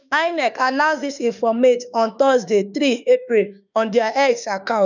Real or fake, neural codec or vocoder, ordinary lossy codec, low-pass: fake; autoencoder, 48 kHz, 32 numbers a frame, DAC-VAE, trained on Japanese speech; none; 7.2 kHz